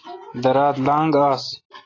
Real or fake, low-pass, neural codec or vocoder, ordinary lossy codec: real; 7.2 kHz; none; AAC, 32 kbps